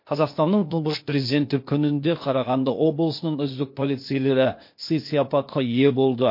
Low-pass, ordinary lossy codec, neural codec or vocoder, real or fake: 5.4 kHz; MP3, 32 kbps; codec, 16 kHz, 0.8 kbps, ZipCodec; fake